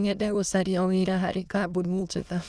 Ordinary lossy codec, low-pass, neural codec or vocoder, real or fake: none; none; autoencoder, 22.05 kHz, a latent of 192 numbers a frame, VITS, trained on many speakers; fake